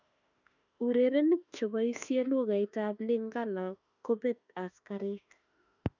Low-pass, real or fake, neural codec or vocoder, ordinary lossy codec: 7.2 kHz; fake; autoencoder, 48 kHz, 32 numbers a frame, DAC-VAE, trained on Japanese speech; none